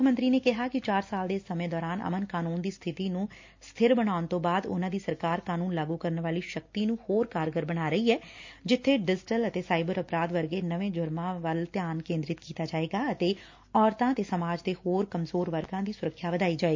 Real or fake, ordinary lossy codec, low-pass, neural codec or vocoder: real; MP3, 48 kbps; 7.2 kHz; none